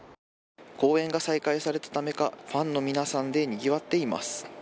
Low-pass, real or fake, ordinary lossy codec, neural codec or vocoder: none; real; none; none